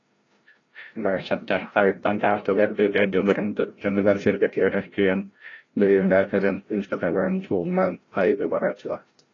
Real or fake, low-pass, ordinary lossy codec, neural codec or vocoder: fake; 7.2 kHz; AAC, 32 kbps; codec, 16 kHz, 0.5 kbps, FreqCodec, larger model